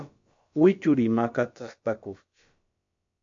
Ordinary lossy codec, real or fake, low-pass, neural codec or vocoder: MP3, 48 kbps; fake; 7.2 kHz; codec, 16 kHz, about 1 kbps, DyCAST, with the encoder's durations